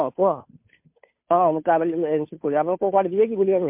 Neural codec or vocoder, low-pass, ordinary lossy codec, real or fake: codec, 16 kHz, 2 kbps, FunCodec, trained on Chinese and English, 25 frames a second; 3.6 kHz; MP3, 32 kbps; fake